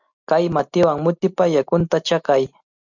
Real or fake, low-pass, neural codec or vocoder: real; 7.2 kHz; none